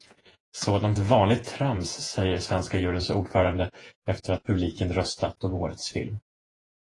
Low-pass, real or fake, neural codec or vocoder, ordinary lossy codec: 10.8 kHz; fake; vocoder, 48 kHz, 128 mel bands, Vocos; AAC, 32 kbps